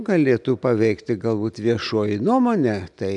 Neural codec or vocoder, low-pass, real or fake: none; 10.8 kHz; real